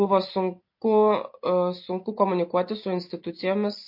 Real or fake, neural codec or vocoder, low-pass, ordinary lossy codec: real; none; 5.4 kHz; MP3, 32 kbps